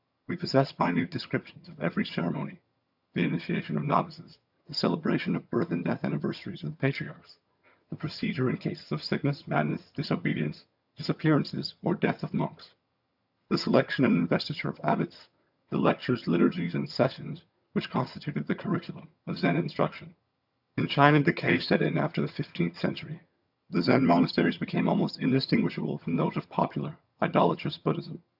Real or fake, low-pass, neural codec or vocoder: fake; 5.4 kHz; vocoder, 22.05 kHz, 80 mel bands, HiFi-GAN